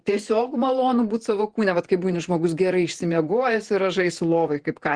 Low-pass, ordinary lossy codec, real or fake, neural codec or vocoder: 9.9 kHz; Opus, 16 kbps; fake; vocoder, 48 kHz, 128 mel bands, Vocos